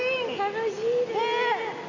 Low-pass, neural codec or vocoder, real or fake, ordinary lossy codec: 7.2 kHz; none; real; none